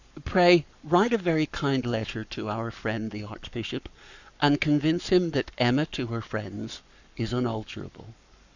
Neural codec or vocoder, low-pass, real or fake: codec, 44.1 kHz, 7.8 kbps, Pupu-Codec; 7.2 kHz; fake